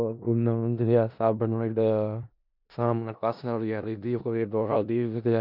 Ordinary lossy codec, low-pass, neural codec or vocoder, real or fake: none; 5.4 kHz; codec, 16 kHz in and 24 kHz out, 0.4 kbps, LongCat-Audio-Codec, four codebook decoder; fake